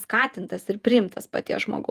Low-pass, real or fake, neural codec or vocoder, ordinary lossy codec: 14.4 kHz; fake; vocoder, 48 kHz, 128 mel bands, Vocos; Opus, 32 kbps